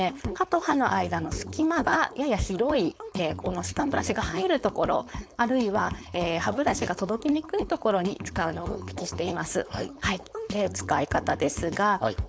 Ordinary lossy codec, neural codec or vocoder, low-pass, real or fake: none; codec, 16 kHz, 4.8 kbps, FACodec; none; fake